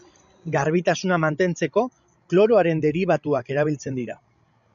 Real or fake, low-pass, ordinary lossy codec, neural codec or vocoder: fake; 7.2 kHz; MP3, 96 kbps; codec, 16 kHz, 16 kbps, FreqCodec, larger model